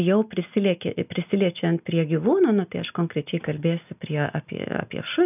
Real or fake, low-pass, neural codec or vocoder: real; 3.6 kHz; none